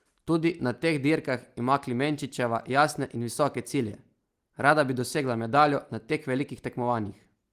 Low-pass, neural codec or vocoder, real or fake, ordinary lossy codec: 14.4 kHz; none; real; Opus, 24 kbps